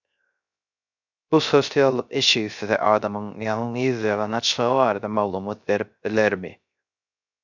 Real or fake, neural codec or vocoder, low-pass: fake; codec, 16 kHz, 0.3 kbps, FocalCodec; 7.2 kHz